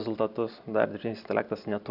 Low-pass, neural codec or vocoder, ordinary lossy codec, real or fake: 5.4 kHz; none; Opus, 64 kbps; real